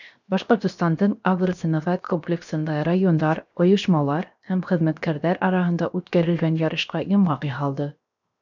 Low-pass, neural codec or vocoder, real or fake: 7.2 kHz; codec, 16 kHz, 0.7 kbps, FocalCodec; fake